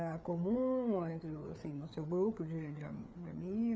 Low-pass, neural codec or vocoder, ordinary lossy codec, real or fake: none; codec, 16 kHz, 4 kbps, FreqCodec, larger model; none; fake